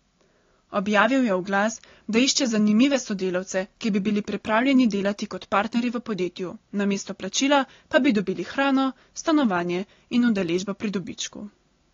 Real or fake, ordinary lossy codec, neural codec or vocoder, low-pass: real; AAC, 32 kbps; none; 7.2 kHz